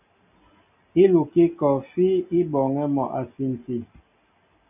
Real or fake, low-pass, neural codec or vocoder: real; 3.6 kHz; none